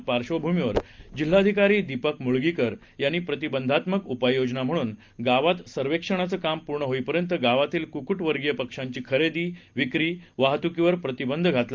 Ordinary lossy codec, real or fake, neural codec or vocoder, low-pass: Opus, 32 kbps; real; none; 7.2 kHz